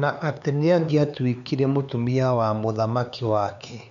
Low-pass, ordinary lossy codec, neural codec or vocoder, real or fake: 7.2 kHz; none; codec, 16 kHz, 4 kbps, X-Codec, HuBERT features, trained on LibriSpeech; fake